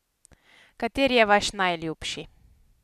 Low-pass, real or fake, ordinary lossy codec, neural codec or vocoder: 14.4 kHz; real; none; none